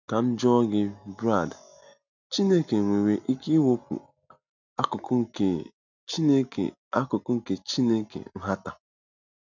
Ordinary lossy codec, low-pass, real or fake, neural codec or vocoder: none; 7.2 kHz; real; none